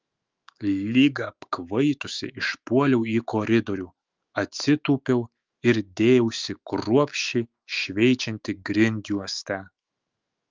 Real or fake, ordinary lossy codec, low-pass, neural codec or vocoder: fake; Opus, 24 kbps; 7.2 kHz; autoencoder, 48 kHz, 128 numbers a frame, DAC-VAE, trained on Japanese speech